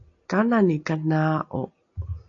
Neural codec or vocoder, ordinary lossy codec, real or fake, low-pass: none; AAC, 48 kbps; real; 7.2 kHz